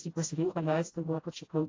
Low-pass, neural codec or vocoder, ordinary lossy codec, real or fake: 7.2 kHz; codec, 16 kHz, 0.5 kbps, FreqCodec, smaller model; AAC, 32 kbps; fake